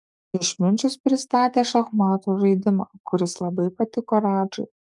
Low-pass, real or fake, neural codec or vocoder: 10.8 kHz; fake; codec, 44.1 kHz, 7.8 kbps, DAC